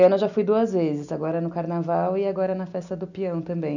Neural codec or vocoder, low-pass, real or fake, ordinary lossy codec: none; 7.2 kHz; real; none